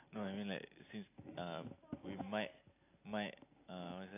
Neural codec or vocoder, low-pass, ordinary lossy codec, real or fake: none; 3.6 kHz; AAC, 24 kbps; real